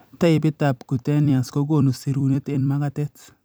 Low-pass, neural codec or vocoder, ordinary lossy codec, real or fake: none; vocoder, 44.1 kHz, 128 mel bands every 256 samples, BigVGAN v2; none; fake